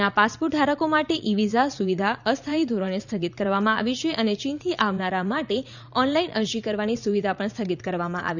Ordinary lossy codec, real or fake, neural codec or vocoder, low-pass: none; fake; vocoder, 44.1 kHz, 80 mel bands, Vocos; 7.2 kHz